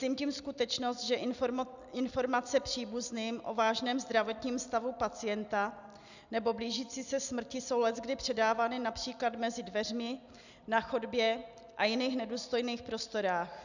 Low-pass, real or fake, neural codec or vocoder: 7.2 kHz; real; none